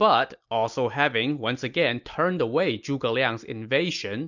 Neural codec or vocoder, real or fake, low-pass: none; real; 7.2 kHz